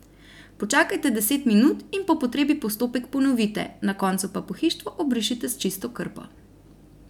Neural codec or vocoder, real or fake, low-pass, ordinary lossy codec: none; real; 19.8 kHz; none